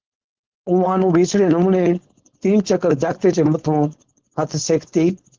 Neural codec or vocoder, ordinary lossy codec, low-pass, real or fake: codec, 16 kHz, 4.8 kbps, FACodec; Opus, 16 kbps; 7.2 kHz; fake